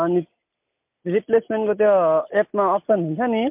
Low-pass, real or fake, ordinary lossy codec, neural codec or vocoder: 3.6 kHz; real; MP3, 32 kbps; none